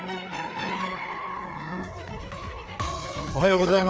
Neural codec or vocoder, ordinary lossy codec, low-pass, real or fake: codec, 16 kHz, 4 kbps, FreqCodec, larger model; none; none; fake